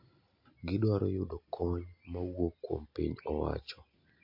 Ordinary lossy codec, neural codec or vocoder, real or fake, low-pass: MP3, 32 kbps; none; real; 5.4 kHz